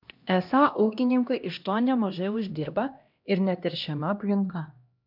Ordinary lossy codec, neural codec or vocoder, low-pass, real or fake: MP3, 48 kbps; codec, 16 kHz, 1 kbps, X-Codec, HuBERT features, trained on LibriSpeech; 5.4 kHz; fake